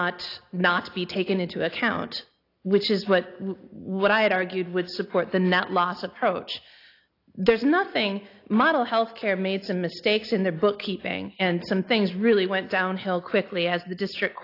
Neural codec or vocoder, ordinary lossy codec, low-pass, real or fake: none; AAC, 32 kbps; 5.4 kHz; real